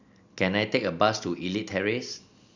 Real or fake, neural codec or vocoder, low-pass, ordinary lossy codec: real; none; 7.2 kHz; none